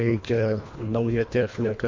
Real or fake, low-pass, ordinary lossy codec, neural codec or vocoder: fake; 7.2 kHz; MP3, 48 kbps; codec, 24 kHz, 1.5 kbps, HILCodec